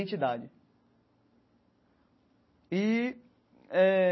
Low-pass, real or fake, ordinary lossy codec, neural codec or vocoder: 7.2 kHz; real; MP3, 24 kbps; none